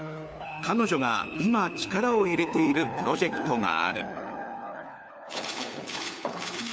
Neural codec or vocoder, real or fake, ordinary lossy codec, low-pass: codec, 16 kHz, 4 kbps, FunCodec, trained on LibriTTS, 50 frames a second; fake; none; none